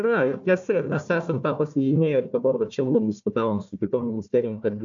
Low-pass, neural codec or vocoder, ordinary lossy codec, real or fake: 7.2 kHz; codec, 16 kHz, 1 kbps, FunCodec, trained on Chinese and English, 50 frames a second; AAC, 64 kbps; fake